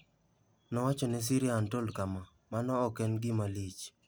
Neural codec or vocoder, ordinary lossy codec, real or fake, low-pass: none; none; real; none